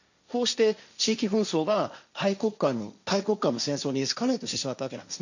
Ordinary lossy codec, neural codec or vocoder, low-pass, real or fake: none; codec, 16 kHz, 1.1 kbps, Voila-Tokenizer; 7.2 kHz; fake